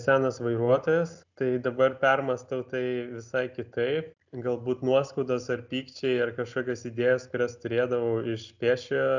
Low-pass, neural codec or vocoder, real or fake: 7.2 kHz; none; real